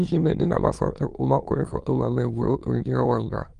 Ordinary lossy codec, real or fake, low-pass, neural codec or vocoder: Opus, 24 kbps; fake; 9.9 kHz; autoencoder, 22.05 kHz, a latent of 192 numbers a frame, VITS, trained on many speakers